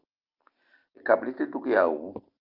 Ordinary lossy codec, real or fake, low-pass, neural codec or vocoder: Opus, 32 kbps; real; 5.4 kHz; none